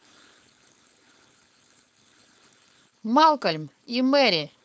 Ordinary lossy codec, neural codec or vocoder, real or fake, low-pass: none; codec, 16 kHz, 4.8 kbps, FACodec; fake; none